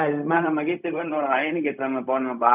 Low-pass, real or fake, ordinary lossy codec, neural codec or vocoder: 3.6 kHz; fake; none; codec, 16 kHz, 0.4 kbps, LongCat-Audio-Codec